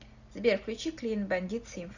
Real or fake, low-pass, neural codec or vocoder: real; 7.2 kHz; none